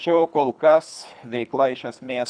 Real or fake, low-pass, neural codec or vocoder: fake; 9.9 kHz; codec, 24 kHz, 3 kbps, HILCodec